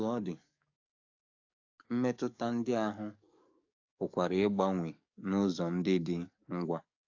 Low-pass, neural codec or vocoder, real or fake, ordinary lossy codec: 7.2 kHz; codec, 44.1 kHz, 7.8 kbps, DAC; fake; AAC, 48 kbps